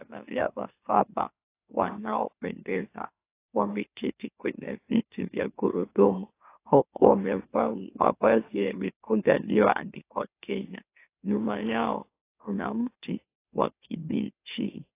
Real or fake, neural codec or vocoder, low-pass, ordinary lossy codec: fake; autoencoder, 44.1 kHz, a latent of 192 numbers a frame, MeloTTS; 3.6 kHz; AAC, 24 kbps